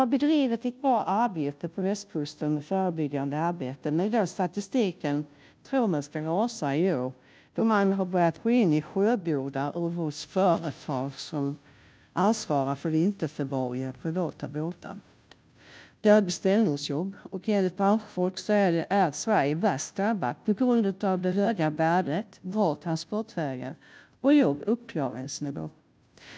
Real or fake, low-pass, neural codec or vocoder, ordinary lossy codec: fake; none; codec, 16 kHz, 0.5 kbps, FunCodec, trained on Chinese and English, 25 frames a second; none